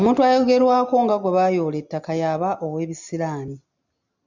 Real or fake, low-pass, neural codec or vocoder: real; 7.2 kHz; none